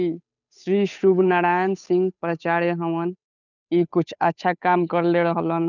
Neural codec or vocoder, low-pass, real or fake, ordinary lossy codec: codec, 16 kHz, 8 kbps, FunCodec, trained on Chinese and English, 25 frames a second; 7.2 kHz; fake; none